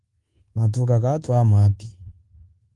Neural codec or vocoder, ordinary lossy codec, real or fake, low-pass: codec, 24 kHz, 1.2 kbps, DualCodec; Opus, 24 kbps; fake; 10.8 kHz